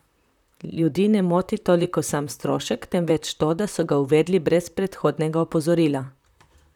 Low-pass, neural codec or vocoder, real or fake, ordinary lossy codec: 19.8 kHz; vocoder, 44.1 kHz, 128 mel bands, Pupu-Vocoder; fake; none